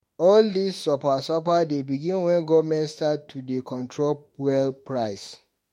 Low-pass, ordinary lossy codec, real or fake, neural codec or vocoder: 19.8 kHz; MP3, 64 kbps; fake; autoencoder, 48 kHz, 32 numbers a frame, DAC-VAE, trained on Japanese speech